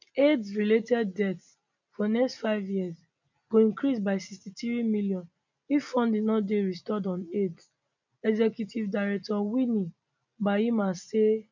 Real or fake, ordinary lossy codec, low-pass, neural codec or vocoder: real; none; 7.2 kHz; none